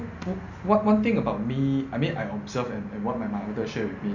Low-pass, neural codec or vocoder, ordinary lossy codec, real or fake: 7.2 kHz; none; none; real